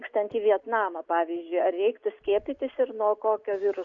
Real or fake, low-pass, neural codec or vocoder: real; 7.2 kHz; none